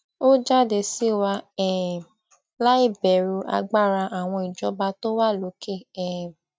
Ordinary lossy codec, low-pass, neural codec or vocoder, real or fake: none; none; none; real